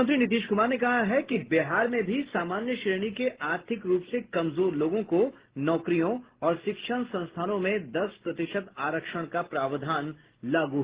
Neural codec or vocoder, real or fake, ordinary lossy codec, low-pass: none; real; Opus, 16 kbps; 3.6 kHz